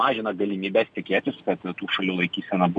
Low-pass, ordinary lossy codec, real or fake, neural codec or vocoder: 9.9 kHz; MP3, 96 kbps; real; none